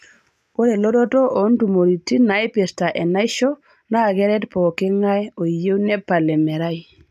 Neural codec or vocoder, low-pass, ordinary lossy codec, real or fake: none; 14.4 kHz; none; real